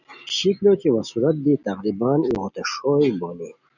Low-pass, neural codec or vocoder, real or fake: 7.2 kHz; none; real